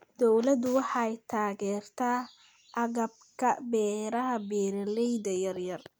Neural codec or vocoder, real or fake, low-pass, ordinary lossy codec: none; real; none; none